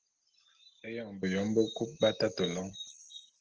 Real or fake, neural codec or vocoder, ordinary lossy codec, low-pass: real; none; Opus, 16 kbps; 7.2 kHz